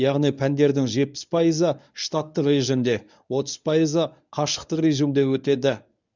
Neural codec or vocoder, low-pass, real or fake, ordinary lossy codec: codec, 24 kHz, 0.9 kbps, WavTokenizer, medium speech release version 1; 7.2 kHz; fake; none